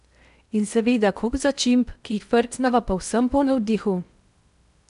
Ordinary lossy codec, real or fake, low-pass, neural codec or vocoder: none; fake; 10.8 kHz; codec, 16 kHz in and 24 kHz out, 0.8 kbps, FocalCodec, streaming, 65536 codes